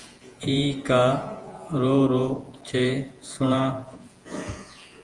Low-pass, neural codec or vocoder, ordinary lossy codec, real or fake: 10.8 kHz; vocoder, 48 kHz, 128 mel bands, Vocos; Opus, 24 kbps; fake